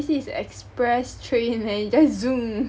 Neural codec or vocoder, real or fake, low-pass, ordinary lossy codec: none; real; none; none